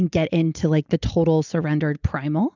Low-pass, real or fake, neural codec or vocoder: 7.2 kHz; real; none